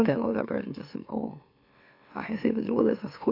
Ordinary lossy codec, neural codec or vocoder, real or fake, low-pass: MP3, 32 kbps; autoencoder, 44.1 kHz, a latent of 192 numbers a frame, MeloTTS; fake; 5.4 kHz